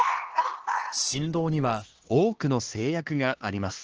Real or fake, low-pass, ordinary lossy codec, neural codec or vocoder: fake; 7.2 kHz; Opus, 16 kbps; codec, 16 kHz, 2 kbps, X-Codec, HuBERT features, trained on LibriSpeech